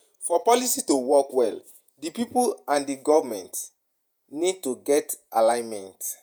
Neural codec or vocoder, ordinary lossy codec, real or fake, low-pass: vocoder, 48 kHz, 128 mel bands, Vocos; none; fake; none